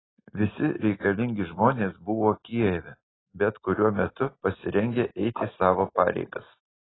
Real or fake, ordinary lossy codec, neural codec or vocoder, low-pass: real; AAC, 16 kbps; none; 7.2 kHz